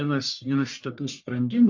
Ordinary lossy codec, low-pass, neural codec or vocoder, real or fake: AAC, 48 kbps; 7.2 kHz; codec, 44.1 kHz, 1.7 kbps, Pupu-Codec; fake